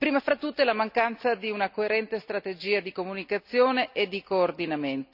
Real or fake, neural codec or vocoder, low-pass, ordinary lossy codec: real; none; 5.4 kHz; none